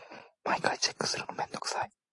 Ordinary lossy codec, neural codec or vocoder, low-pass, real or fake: AAC, 48 kbps; none; 10.8 kHz; real